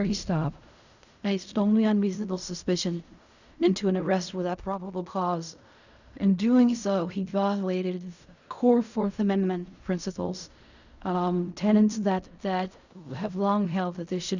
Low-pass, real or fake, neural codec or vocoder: 7.2 kHz; fake; codec, 16 kHz in and 24 kHz out, 0.4 kbps, LongCat-Audio-Codec, fine tuned four codebook decoder